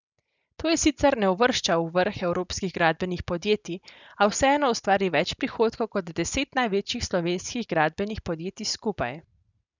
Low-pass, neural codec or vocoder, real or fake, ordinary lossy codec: 7.2 kHz; none; real; none